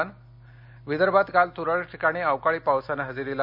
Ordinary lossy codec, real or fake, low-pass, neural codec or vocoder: none; real; 5.4 kHz; none